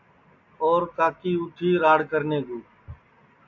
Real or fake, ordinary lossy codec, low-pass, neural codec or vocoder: real; AAC, 48 kbps; 7.2 kHz; none